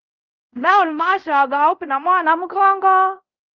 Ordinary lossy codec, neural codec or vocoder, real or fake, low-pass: Opus, 24 kbps; codec, 24 kHz, 0.5 kbps, DualCodec; fake; 7.2 kHz